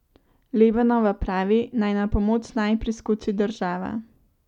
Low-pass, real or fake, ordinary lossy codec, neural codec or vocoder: 19.8 kHz; real; none; none